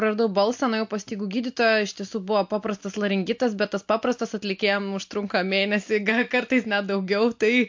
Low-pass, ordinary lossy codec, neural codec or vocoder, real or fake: 7.2 kHz; MP3, 48 kbps; none; real